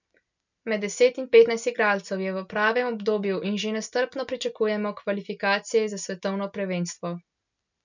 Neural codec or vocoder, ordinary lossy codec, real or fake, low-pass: none; none; real; 7.2 kHz